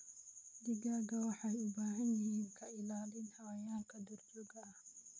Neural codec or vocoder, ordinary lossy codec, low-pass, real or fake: none; none; none; real